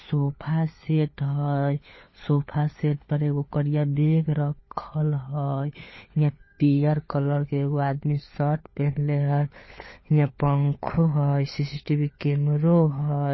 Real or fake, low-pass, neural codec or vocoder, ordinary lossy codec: fake; 7.2 kHz; codec, 16 kHz, 4 kbps, FunCodec, trained on LibriTTS, 50 frames a second; MP3, 24 kbps